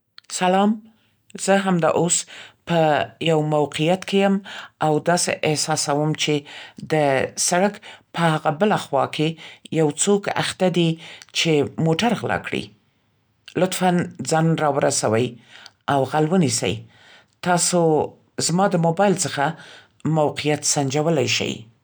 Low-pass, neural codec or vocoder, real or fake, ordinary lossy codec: none; none; real; none